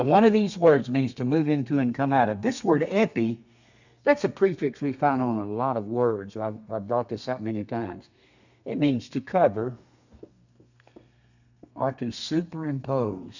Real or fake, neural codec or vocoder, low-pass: fake; codec, 32 kHz, 1.9 kbps, SNAC; 7.2 kHz